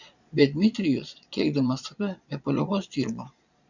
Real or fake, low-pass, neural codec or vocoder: fake; 7.2 kHz; vocoder, 22.05 kHz, 80 mel bands, Vocos